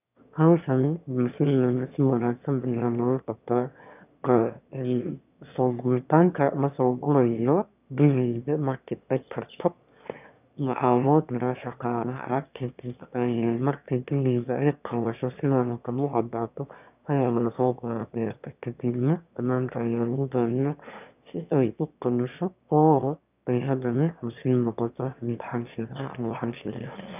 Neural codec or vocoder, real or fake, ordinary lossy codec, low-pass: autoencoder, 22.05 kHz, a latent of 192 numbers a frame, VITS, trained on one speaker; fake; none; 3.6 kHz